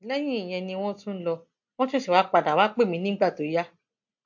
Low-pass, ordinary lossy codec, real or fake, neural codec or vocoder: 7.2 kHz; MP3, 48 kbps; real; none